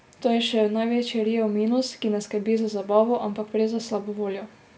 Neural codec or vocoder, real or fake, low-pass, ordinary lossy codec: none; real; none; none